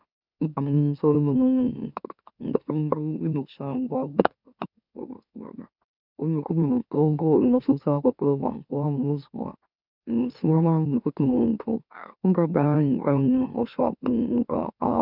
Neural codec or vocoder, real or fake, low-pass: autoencoder, 44.1 kHz, a latent of 192 numbers a frame, MeloTTS; fake; 5.4 kHz